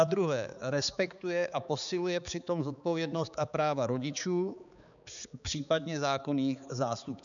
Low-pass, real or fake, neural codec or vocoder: 7.2 kHz; fake; codec, 16 kHz, 4 kbps, X-Codec, HuBERT features, trained on balanced general audio